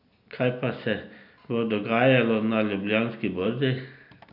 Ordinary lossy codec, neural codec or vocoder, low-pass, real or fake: none; none; 5.4 kHz; real